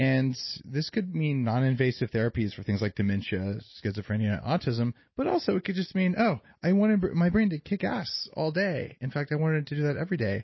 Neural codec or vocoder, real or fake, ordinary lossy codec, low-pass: none; real; MP3, 24 kbps; 7.2 kHz